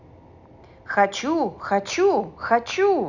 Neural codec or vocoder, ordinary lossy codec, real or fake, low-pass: none; none; real; 7.2 kHz